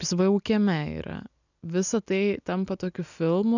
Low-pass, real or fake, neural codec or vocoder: 7.2 kHz; real; none